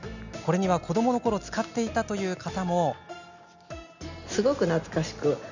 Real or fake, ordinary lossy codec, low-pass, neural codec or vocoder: real; none; 7.2 kHz; none